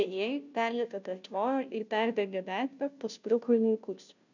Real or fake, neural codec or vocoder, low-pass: fake; codec, 16 kHz, 0.5 kbps, FunCodec, trained on Chinese and English, 25 frames a second; 7.2 kHz